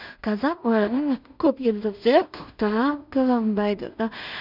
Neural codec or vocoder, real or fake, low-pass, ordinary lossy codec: codec, 16 kHz in and 24 kHz out, 0.4 kbps, LongCat-Audio-Codec, two codebook decoder; fake; 5.4 kHz; none